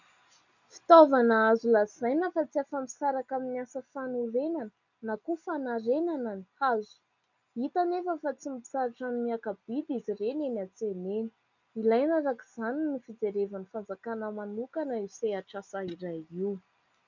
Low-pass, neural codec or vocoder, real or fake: 7.2 kHz; none; real